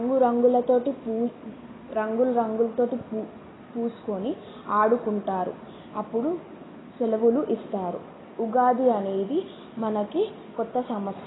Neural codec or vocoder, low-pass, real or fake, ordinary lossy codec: none; 7.2 kHz; real; AAC, 16 kbps